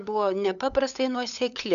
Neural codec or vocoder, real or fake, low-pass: codec, 16 kHz, 8 kbps, FunCodec, trained on LibriTTS, 25 frames a second; fake; 7.2 kHz